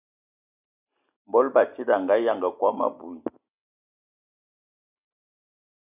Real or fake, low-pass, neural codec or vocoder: real; 3.6 kHz; none